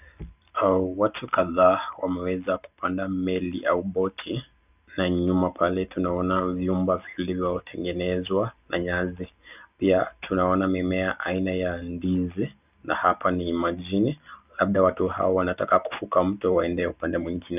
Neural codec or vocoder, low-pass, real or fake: none; 3.6 kHz; real